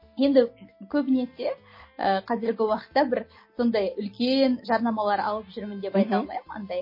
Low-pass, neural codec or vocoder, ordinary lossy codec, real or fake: 5.4 kHz; none; MP3, 24 kbps; real